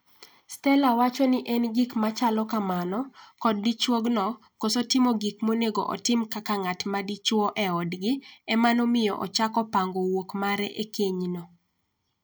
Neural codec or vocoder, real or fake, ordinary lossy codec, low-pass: none; real; none; none